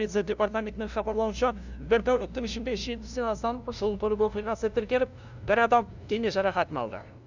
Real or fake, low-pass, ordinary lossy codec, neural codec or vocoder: fake; 7.2 kHz; none; codec, 16 kHz, 0.5 kbps, FunCodec, trained on LibriTTS, 25 frames a second